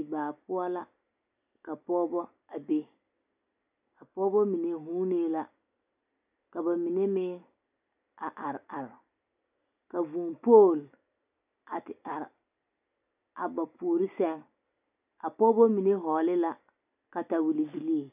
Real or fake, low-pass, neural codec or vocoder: real; 3.6 kHz; none